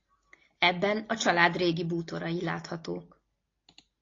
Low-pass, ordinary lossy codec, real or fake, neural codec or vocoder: 7.2 kHz; AAC, 32 kbps; real; none